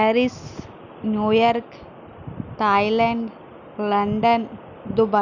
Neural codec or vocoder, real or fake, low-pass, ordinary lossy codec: none; real; 7.2 kHz; none